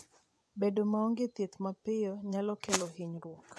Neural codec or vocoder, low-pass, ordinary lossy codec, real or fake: vocoder, 24 kHz, 100 mel bands, Vocos; none; none; fake